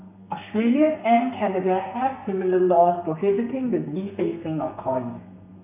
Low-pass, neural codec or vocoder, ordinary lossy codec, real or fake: 3.6 kHz; codec, 32 kHz, 1.9 kbps, SNAC; none; fake